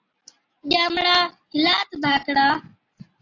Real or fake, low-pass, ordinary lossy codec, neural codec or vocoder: real; 7.2 kHz; AAC, 32 kbps; none